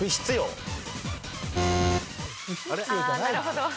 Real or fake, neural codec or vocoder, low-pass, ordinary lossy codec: real; none; none; none